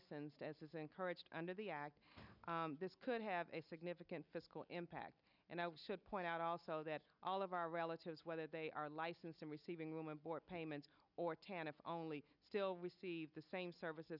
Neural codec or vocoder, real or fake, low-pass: none; real; 5.4 kHz